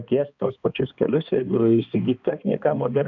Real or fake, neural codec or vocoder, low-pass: fake; codec, 16 kHz, 2 kbps, X-Codec, HuBERT features, trained on balanced general audio; 7.2 kHz